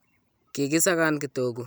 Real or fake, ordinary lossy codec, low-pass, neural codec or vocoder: real; none; none; none